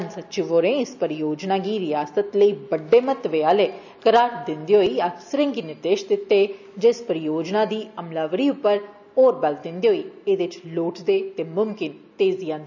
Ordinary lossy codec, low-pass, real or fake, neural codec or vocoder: none; 7.2 kHz; real; none